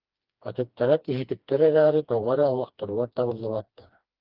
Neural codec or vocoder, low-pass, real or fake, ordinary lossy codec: codec, 16 kHz, 2 kbps, FreqCodec, smaller model; 5.4 kHz; fake; Opus, 32 kbps